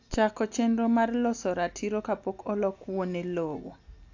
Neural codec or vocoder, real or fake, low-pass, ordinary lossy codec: none; real; 7.2 kHz; AAC, 48 kbps